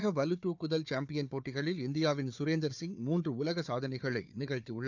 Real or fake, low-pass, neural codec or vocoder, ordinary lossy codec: fake; 7.2 kHz; codec, 16 kHz, 4 kbps, FunCodec, trained on Chinese and English, 50 frames a second; none